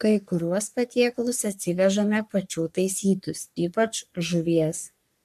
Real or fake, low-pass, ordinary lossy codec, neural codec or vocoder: fake; 14.4 kHz; AAC, 96 kbps; codec, 44.1 kHz, 3.4 kbps, Pupu-Codec